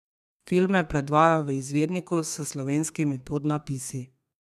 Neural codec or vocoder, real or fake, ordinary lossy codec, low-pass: codec, 32 kHz, 1.9 kbps, SNAC; fake; none; 14.4 kHz